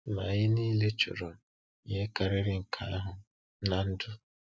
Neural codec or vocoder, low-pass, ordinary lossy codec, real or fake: none; none; none; real